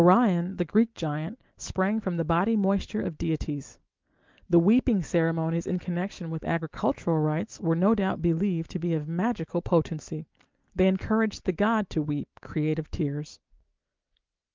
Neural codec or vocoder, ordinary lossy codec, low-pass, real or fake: none; Opus, 24 kbps; 7.2 kHz; real